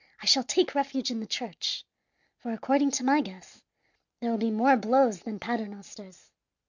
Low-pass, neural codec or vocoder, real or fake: 7.2 kHz; none; real